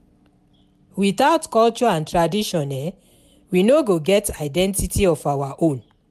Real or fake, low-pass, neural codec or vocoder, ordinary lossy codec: real; 14.4 kHz; none; MP3, 96 kbps